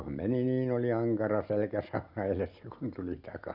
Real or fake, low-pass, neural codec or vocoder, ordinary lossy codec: real; 5.4 kHz; none; none